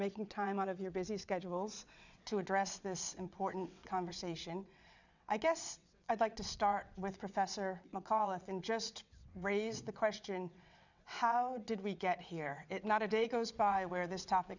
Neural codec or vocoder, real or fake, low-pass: vocoder, 22.05 kHz, 80 mel bands, WaveNeXt; fake; 7.2 kHz